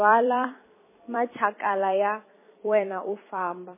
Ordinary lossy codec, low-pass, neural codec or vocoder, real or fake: MP3, 16 kbps; 3.6 kHz; vocoder, 44.1 kHz, 128 mel bands every 256 samples, BigVGAN v2; fake